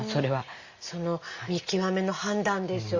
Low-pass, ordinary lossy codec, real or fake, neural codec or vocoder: 7.2 kHz; Opus, 64 kbps; real; none